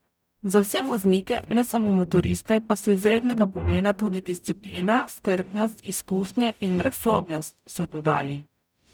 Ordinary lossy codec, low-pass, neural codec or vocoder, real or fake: none; none; codec, 44.1 kHz, 0.9 kbps, DAC; fake